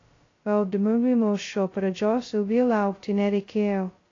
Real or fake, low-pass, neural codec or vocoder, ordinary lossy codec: fake; 7.2 kHz; codec, 16 kHz, 0.2 kbps, FocalCodec; AAC, 32 kbps